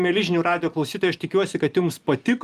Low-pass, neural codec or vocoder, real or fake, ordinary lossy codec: 14.4 kHz; none; real; Opus, 32 kbps